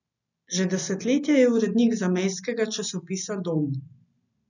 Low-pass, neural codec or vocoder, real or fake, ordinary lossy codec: 7.2 kHz; none; real; none